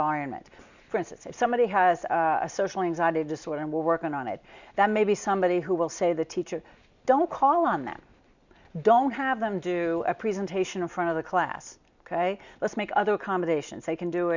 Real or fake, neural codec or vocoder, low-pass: real; none; 7.2 kHz